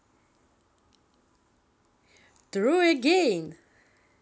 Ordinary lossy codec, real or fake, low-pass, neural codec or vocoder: none; real; none; none